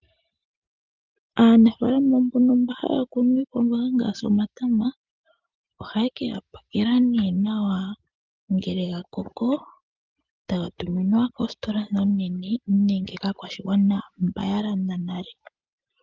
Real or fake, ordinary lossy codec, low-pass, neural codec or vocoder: real; Opus, 24 kbps; 7.2 kHz; none